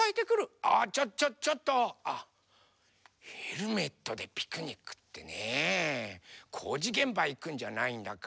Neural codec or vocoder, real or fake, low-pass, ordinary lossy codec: none; real; none; none